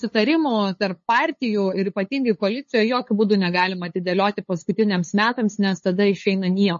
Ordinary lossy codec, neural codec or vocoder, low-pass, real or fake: MP3, 32 kbps; codec, 16 kHz, 8 kbps, FunCodec, trained on LibriTTS, 25 frames a second; 7.2 kHz; fake